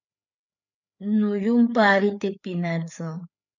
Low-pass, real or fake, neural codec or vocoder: 7.2 kHz; fake; codec, 16 kHz, 4 kbps, FreqCodec, larger model